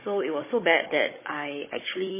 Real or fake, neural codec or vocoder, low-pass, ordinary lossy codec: fake; codec, 16 kHz, 16 kbps, FunCodec, trained on Chinese and English, 50 frames a second; 3.6 kHz; MP3, 16 kbps